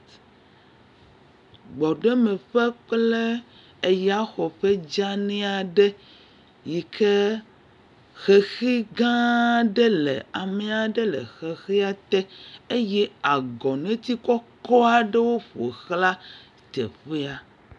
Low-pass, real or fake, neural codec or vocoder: 10.8 kHz; real; none